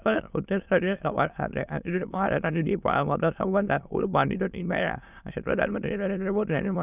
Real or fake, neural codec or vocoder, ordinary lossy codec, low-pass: fake; autoencoder, 22.05 kHz, a latent of 192 numbers a frame, VITS, trained on many speakers; none; 3.6 kHz